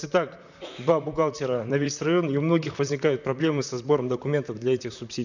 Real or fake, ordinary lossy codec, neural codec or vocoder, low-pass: fake; none; vocoder, 44.1 kHz, 128 mel bands, Pupu-Vocoder; 7.2 kHz